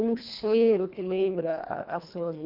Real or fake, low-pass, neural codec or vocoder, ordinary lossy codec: fake; 5.4 kHz; codec, 24 kHz, 1.5 kbps, HILCodec; none